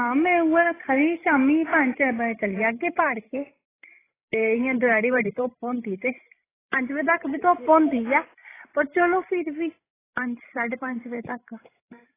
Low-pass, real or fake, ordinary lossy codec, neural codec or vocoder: 3.6 kHz; fake; AAC, 16 kbps; codec, 16 kHz, 16 kbps, FreqCodec, larger model